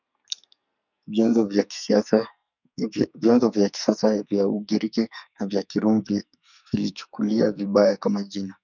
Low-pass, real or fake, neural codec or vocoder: 7.2 kHz; fake; codec, 44.1 kHz, 2.6 kbps, SNAC